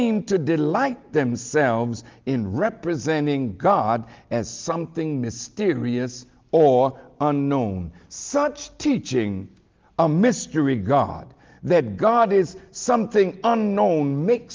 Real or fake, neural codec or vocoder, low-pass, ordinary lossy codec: real; none; 7.2 kHz; Opus, 32 kbps